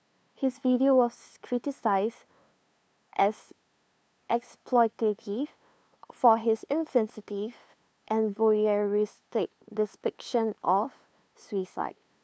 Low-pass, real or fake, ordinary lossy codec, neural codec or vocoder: none; fake; none; codec, 16 kHz, 2 kbps, FunCodec, trained on LibriTTS, 25 frames a second